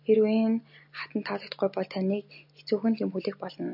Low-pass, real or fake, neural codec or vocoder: 5.4 kHz; real; none